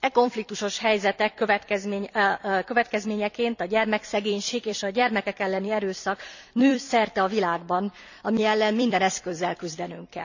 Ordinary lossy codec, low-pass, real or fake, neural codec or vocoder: none; 7.2 kHz; fake; vocoder, 44.1 kHz, 128 mel bands every 256 samples, BigVGAN v2